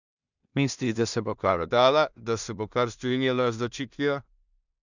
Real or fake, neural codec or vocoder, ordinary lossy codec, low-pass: fake; codec, 16 kHz in and 24 kHz out, 0.4 kbps, LongCat-Audio-Codec, two codebook decoder; none; 7.2 kHz